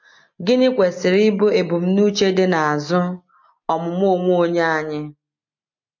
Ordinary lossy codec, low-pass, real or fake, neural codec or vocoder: MP3, 48 kbps; 7.2 kHz; real; none